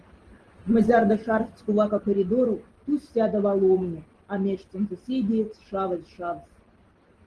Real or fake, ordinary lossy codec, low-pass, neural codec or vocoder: real; Opus, 16 kbps; 10.8 kHz; none